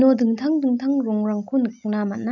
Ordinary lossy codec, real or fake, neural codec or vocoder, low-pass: none; real; none; 7.2 kHz